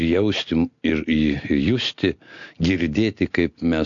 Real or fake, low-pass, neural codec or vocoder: real; 7.2 kHz; none